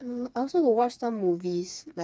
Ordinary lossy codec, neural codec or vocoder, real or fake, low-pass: none; codec, 16 kHz, 4 kbps, FreqCodec, smaller model; fake; none